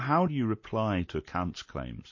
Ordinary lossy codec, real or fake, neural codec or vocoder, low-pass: MP3, 32 kbps; real; none; 7.2 kHz